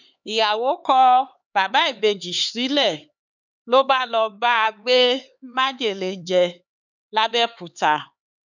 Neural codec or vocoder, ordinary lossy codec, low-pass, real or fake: codec, 16 kHz, 4 kbps, X-Codec, HuBERT features, trained on LibriSpeech; none; 7.2 kHz; fake